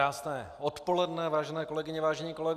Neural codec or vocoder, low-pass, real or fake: none; 14.4 kHz; real